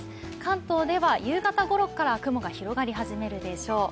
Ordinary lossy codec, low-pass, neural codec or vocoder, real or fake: none; none; none; real